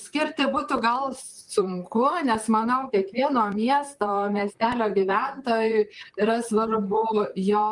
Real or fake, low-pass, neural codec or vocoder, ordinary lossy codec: fake; 10.8 kHz; vocoder, 44.1 kHz, 128 mel bands, Pupu-Vocoder; Opus, 32 kbps